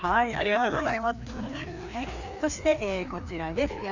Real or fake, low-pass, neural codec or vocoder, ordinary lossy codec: fake; 7.2 kHz; codec, 16 kHz, 2 kbps, FreqCodec, larger model; none